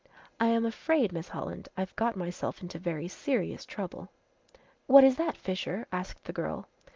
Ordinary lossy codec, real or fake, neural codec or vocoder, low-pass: Opus, 32 kbps; real; none; 7.2 kHz